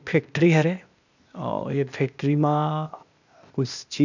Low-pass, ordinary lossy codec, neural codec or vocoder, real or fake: 7.2 kHz; none; codec, 16 kHz, 0.8 kbps, ZipCodec; fake